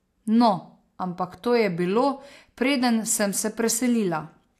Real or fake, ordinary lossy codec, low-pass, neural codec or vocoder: real; AAC, 64 kbps; 14.4 kHz; none